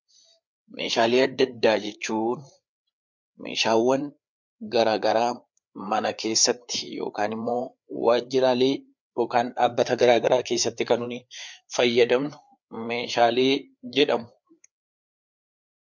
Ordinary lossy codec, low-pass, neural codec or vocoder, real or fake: MP3, 64 kbps; 7.2 kHz; codec, 16 kHz, 4 kbps, FreqCodec, larger model; fake